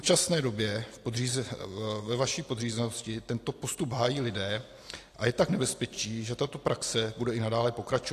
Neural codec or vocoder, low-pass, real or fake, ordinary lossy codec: none; 14.4 kHz; real; AAC, 64 kbps